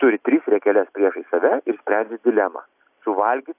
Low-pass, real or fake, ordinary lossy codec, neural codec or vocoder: 3.6 kHz; real; AAC, 32 kbps; none